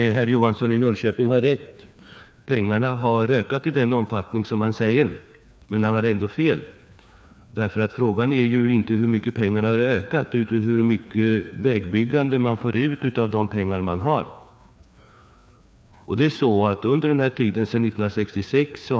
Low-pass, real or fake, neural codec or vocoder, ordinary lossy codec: none; fake; codec, 16 kHz, 2 kbps, FreqCodec, larger model; none